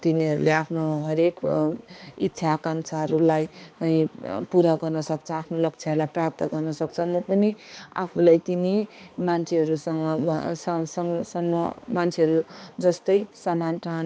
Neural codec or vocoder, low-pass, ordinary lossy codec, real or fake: codec, 16 kHz, 2 kbps, X-Codec, HuBERT features, trained on balanced general audio; none; none; fake